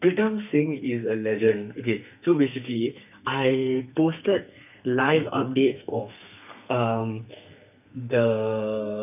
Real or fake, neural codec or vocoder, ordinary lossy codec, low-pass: fake; codec, 32 kHz, 1.9 kbps, SNAC; none; 3.6 kHz